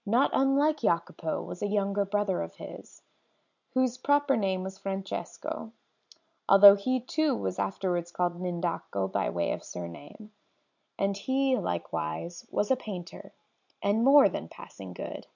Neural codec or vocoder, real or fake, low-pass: none; real; 7.2 kHz